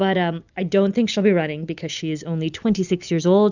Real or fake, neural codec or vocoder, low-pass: real; none; 7.2 kHz